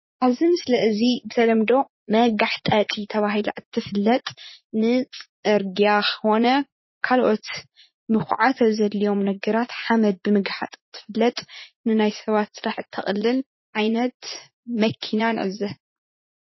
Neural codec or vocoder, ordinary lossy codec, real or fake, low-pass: vocoder, 44.1 kHz, 80 mel bands, Vocos; MP3, 24 kbps; fake; 7.2 kHz